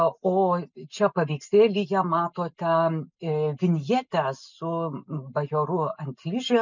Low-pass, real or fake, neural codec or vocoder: 7.2 kHz; real; none